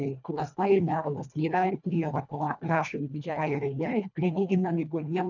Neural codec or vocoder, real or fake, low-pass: codec, 24 kHz, 1.5 kbps, HILCodec; fake; 7.2 kHz